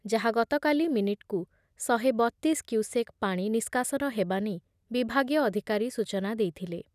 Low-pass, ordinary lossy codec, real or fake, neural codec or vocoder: 14.4 kHz; none; real; none